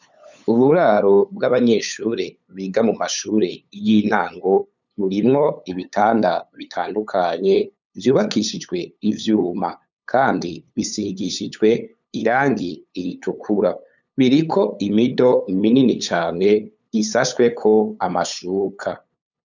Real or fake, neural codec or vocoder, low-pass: fake; codec, 16 kHz, 8 kbps, FunCodec, trained on LibriTTS, 25 frames a second; 7.2 kHz